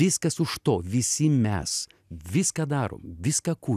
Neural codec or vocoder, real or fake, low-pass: none; real; 14.4 kHz